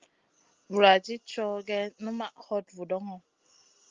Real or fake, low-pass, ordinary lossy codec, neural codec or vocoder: real; 7.2 kHz; Opus, 32 kbps; none